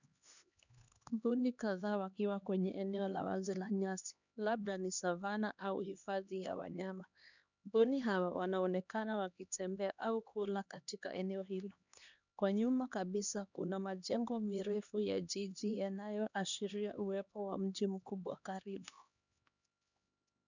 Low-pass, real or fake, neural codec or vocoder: 7.2 kHz; fake; codec, 16 kHz, 2 kbps, X-Codec, HuBERT features, trained on LibriSpeech